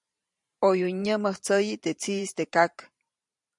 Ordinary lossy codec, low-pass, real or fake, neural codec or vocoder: MP3, 64 kbps; 10.8 kHz; fake; vocoder, 44.1 kHz, 128 mel bands every 512 samples, BigVGAN v2